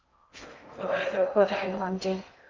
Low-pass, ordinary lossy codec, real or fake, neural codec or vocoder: 7.2 kHz; Opus, 24 kbps; fake; codec, 16 kHz in and 24 kHz out, 0.6 kbps, FocalCodec, streaming, 4096 codes